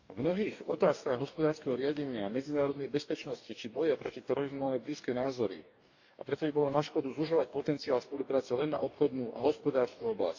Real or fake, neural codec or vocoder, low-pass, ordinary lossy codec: fake; codec, 44.1 kHz, 2.6 kbps, DAC; 7.2 kHz; none